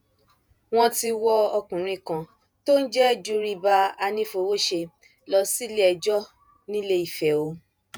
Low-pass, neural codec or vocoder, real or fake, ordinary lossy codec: none; vocoder, 48 kHz, 128 mel bands, Vocos; fake; none